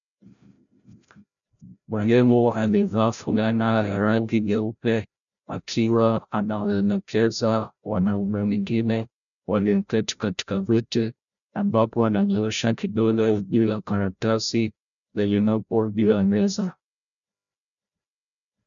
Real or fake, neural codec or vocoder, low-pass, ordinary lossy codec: fake; codec, 16 kHz, 0.5 kbps, FreqCodec, larger model; 7.2 kHz; MP3, 96 kbps